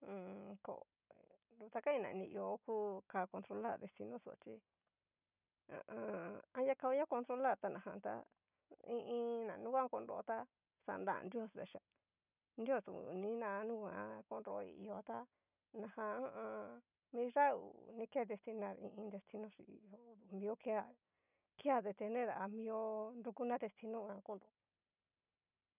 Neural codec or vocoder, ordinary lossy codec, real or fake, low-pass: none; none; real; 3.6 kHz